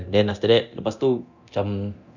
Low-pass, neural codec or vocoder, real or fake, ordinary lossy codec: 7.2 kHz; codec, 24 kHz, 0.9 kbps, DualCodec; fake; none